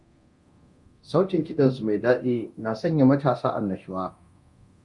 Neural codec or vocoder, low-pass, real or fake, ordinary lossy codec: codec, 24 kHz, 0.9 kbps, DualCodec; 10.8 kHz; fake; Opus, 64 kbps